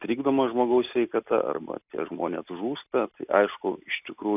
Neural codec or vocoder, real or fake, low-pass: none; real; 3.6 kHz